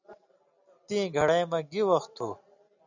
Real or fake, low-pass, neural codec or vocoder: real; 7.2 kHz; none